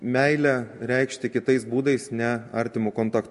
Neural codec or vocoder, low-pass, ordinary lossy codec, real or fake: none; 14.4 kHz; MP3, 48 kbps; real